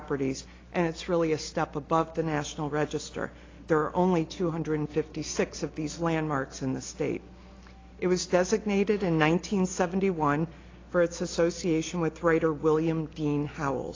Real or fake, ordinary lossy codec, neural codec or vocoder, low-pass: real; AAC, 32 kbps; none; 7.2 kHz